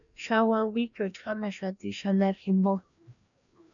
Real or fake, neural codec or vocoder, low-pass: fake; codec, 16 kHz, 1 kbps, FreqCodec, larger model; 7.2 kHz